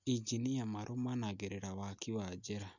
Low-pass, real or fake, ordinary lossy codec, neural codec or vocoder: 7.2 kHz; real; none; none